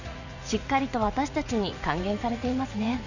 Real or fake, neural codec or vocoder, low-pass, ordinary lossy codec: fake; vocoder, 44.1 kHz, 80 mel bands, Vocos; 7.2 kHz; none